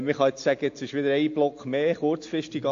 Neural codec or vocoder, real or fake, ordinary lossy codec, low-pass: none; real; AAC, 48 kbps; 7.2 kHz